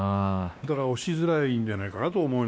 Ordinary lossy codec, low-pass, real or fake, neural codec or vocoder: none; none; fake; codec, 16 kHz, 2 kbps, X-Codec, WavLM features, trained on Multilingual LibriSpeech